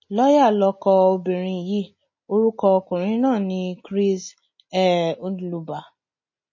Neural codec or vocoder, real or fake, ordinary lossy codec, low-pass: none; real; MP3, 32 kbps; 7.2 kHz